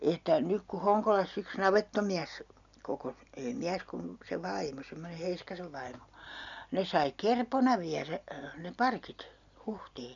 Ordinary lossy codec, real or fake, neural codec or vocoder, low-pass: none; real; none; 7.2 kHz